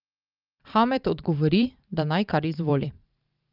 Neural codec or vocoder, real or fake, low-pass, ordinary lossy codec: vocoder, 44.1 kHz, 128 mel bands every 512 samples, BigVGAN v2; fake; 5.4 kHz; Opus, 32 kbps